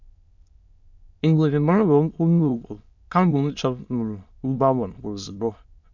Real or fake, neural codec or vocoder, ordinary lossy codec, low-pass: fake; autoencoder, 22.05 kHz, a latent of 192 numbers a frame, VITS, trained on many speakers; MP3, 64 kbps; 7.2 kHz